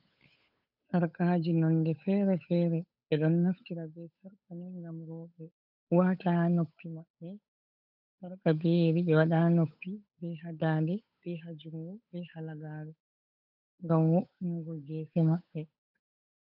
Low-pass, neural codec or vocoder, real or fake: 5.4 kHz; codec, 16 kHz, 8 kbps, FunCodec, trained on Chinese and English, 25 frames a second; fake